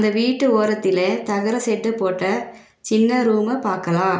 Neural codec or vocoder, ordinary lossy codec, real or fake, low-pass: none; none; real; none